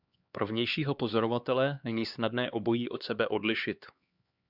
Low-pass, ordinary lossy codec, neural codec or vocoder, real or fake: 5.4 kHz; Opus, 64 kbps; codec, 16 kHz, 2 kbps, X-Codec, HuBERT features, trained on LibriSpeech; fake